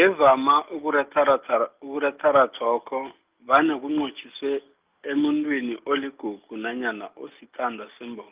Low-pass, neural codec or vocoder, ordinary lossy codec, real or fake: 3.6 kHz; none; Opus, 24 kbps; real